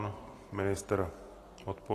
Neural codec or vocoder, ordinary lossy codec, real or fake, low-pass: none; AAC, 48 kbps; real; 14.4 kHz